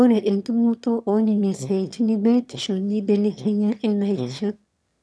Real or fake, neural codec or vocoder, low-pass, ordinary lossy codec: fake; autoencoder, 22.05 kHz, a latent of 192 numbers a frame, VITS, trained on one speaker; none; none